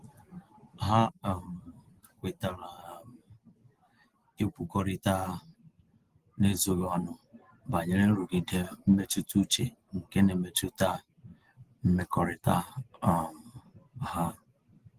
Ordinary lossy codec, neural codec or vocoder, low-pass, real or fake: Opus, 16 kbps; none; 14.4 kHz; real